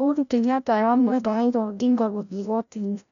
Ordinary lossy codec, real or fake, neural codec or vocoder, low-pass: none; fake; codec, 16 kHz, 0.5 kbps, FreqCodec, larger model; 7.2 kHz